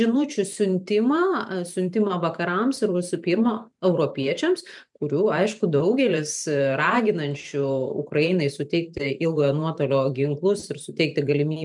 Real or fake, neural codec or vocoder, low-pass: fake; vocoder, 44.1 kHz, 128 mel bands every 512 samples, BigVGAN v2; 10.8 kHz